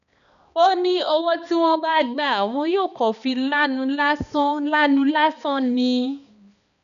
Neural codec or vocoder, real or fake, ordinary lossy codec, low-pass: codec, 16 kHz, 2 kbps, X-Codec, HuBERT features, trained on balanced general audio; fake; MP3, 96 kbps; 7.2 kHz